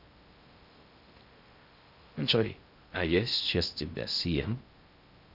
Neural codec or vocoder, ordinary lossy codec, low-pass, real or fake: codec, 16 kHz in and 24 kHz out, 0.6 kbps, FocalCodec, streaming, 4096 codes; Opus, 64 kbps; 5.4 kHz; fake